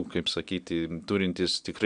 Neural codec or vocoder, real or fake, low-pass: none; real; 9.9 kHz